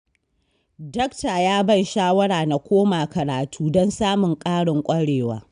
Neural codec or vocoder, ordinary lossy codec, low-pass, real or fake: none; none; 9.9 kHz; real